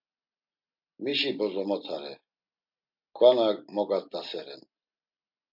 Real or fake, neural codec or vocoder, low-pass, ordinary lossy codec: real; none; 5.4 kHz; MP3, 48 kbps